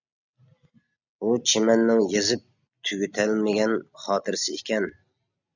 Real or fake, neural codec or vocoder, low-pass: real; none; 7.2 kHz